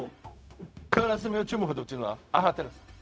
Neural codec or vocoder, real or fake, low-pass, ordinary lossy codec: codec, 16 kHz, 0.4 kbps, LongCat-Audio-Codec; fake; none; none